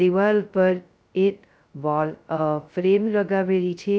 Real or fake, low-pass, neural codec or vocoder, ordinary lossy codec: fake; none; codec, 16 kHz, 0.2 kbps, FocalCodec; none